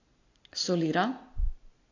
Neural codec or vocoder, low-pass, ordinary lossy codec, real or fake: none; 7.2 kHz; AAC, 48 kbps; real